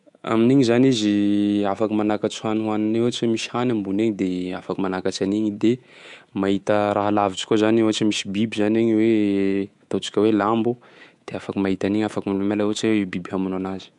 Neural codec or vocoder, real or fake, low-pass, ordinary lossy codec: codec, 24 kHz, 3.1 kbps, DualCodec; fake; 10.8 kHz; MP3, 64 kbps